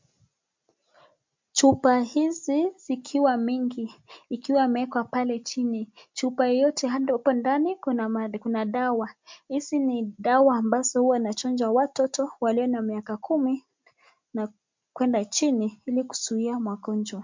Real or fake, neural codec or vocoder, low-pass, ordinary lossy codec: real; none; 7.2 kHz; MP3, 64 kbps